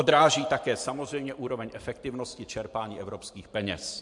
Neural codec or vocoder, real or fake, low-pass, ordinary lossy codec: vocoder, 44.1 kHz, 128 mel bands every 512 samples, BigVGAN v2; fake; 10.8 kHz; MP3, 64 kbps